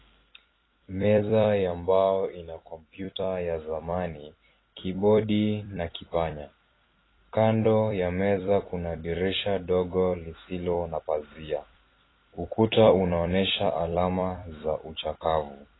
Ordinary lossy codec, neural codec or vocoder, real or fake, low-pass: AAC, 16 kbps; vocoder, 44.1 kHz, 128 mel bands every 256 samples, BigVGAN v2; fake; 7.2 kHz